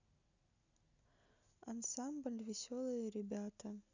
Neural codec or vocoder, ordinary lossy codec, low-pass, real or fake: none; none; 7.2 kHz; real